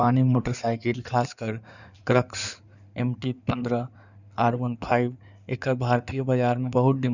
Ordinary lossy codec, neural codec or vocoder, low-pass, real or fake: none; codec, 16 kHz in and 24 kHz out, 2.2 kbps, FireRedTTS-2 codec; 7.2 kHz; fake